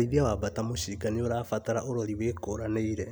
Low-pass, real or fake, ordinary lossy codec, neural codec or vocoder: none; real; none; none